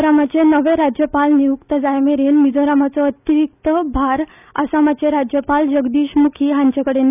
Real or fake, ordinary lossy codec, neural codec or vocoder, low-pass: real; none; none; 3.6 kHz